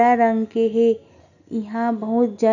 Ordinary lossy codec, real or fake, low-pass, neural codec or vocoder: none; real; 7.2 kHz; none